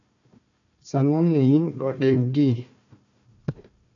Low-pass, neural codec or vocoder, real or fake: 7.2 kHz; codec, 16 kHz, 1 kbps, FunCodec, trained on Chinese and English, 50 frames a second; fake